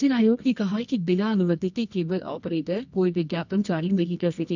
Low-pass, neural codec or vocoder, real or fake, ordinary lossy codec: 7.2 kHz; codec, 24 kHz, 0.9 kbps, WavTokenizer, medium music audio release; fake; none